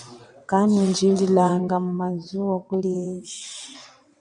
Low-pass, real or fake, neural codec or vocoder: 9.9 kHz; fake; vocoder, 22.05 kHz, 80 mel bands, WaveNeXt